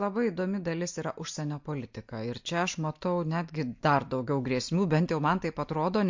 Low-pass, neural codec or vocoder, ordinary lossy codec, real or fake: 7.2 kHz; none; MP3, 48 kbps; real